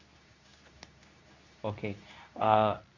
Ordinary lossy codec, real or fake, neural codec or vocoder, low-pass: none; fake; codec, 24 kHz, 0.9 kbps, WavTokenizer, medium speech release version 2; 7.2 kHz